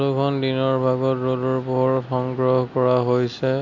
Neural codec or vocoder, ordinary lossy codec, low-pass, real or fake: none; Opus, 64 kbps; 7.2 kHz; real